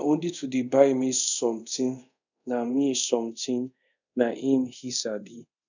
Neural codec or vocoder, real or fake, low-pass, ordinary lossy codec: codec, 24 kHz, 0.5 kbps, DualCodec; fake; 7.2 kHz; none